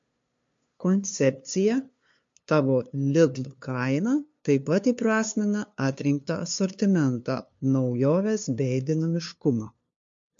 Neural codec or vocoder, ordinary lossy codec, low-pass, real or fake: codec, 16 kHz, 2 kbps, FunCodec, trained on LibriTTS, 25 frames a second; MP3, 48 kbps; 7.2 kHz; fake